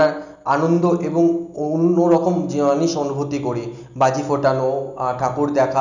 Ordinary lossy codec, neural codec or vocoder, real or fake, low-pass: none; none; real; 7.2 kHz